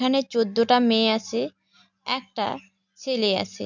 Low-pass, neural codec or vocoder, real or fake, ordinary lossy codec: 7.2 kHz; none; real; none